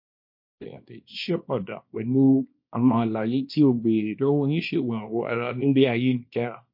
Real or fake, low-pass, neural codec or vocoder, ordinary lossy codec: fake; 5.4 kHz; codec, 24 kHz, 0.9 kbps, WavTokenizer, small release; MP3, 32 kbps